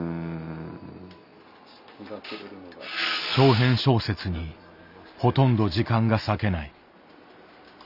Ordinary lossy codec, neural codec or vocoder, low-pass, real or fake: none; none; 5.4 kHz; real